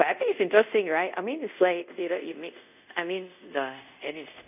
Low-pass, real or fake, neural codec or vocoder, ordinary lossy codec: 3.6 kHz; fake; codec, 24 kHz, 0.5 kbps, DualCodec; none